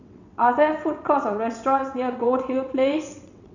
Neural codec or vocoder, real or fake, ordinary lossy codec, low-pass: vocoder, 22.05 kHz, 80 mel bands, WaveNeXt; fake; none; 7.2 kHz